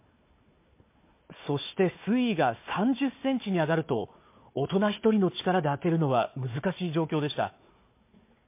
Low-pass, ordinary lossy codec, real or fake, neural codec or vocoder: 3.6 kHz; MP3, 24 kbps; fake; codec, 16 kHz, 4 kbps, FunCodec, trained on Chinese and English, 50 frames a second